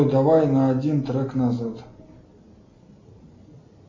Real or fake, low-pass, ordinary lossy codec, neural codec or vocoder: real; 7.2 kHz; MP3, 64 kbps; none